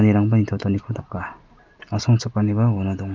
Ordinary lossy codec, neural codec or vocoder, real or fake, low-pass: Opus, 24 kbps; none; real; 7.2 kHz